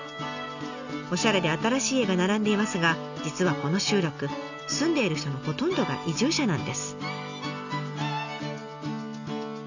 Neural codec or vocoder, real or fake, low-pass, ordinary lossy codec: none; real; 7.2 kHz; none